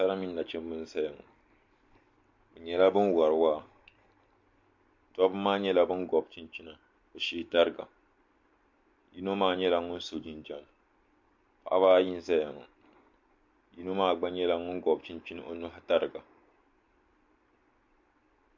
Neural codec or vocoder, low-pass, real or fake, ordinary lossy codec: none; 7.2 kHz; real; MP3, 48 kbps